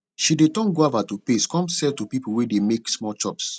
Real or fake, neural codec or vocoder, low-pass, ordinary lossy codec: real; none; none; none